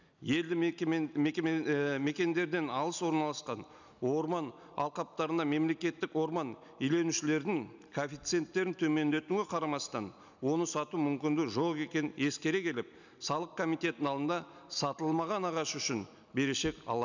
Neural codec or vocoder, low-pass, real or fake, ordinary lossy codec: none; 7.2 kHz; real; none